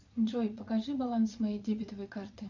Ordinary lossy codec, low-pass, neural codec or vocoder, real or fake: MP3, 64 kbps; 7.2 kHz; vocoder, 22.05 kHz, 80 mel bands, Vocos; fake